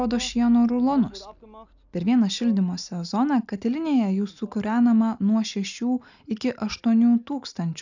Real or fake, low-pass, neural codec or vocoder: real; 7.2 kHz; none